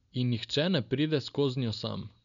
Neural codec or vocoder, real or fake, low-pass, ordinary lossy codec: none; real; 7.2 kHz; none